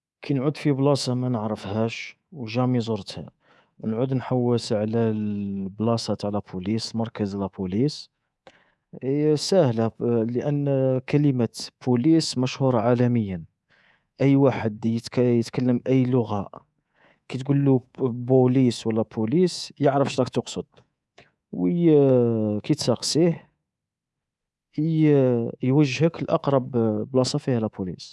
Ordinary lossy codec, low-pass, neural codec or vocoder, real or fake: none; none; codec, 24 kHz, 3.1 kbps, DualCodec; fake